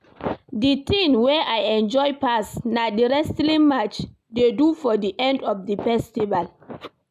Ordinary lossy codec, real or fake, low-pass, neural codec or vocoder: none; real; 14.4 kHz; none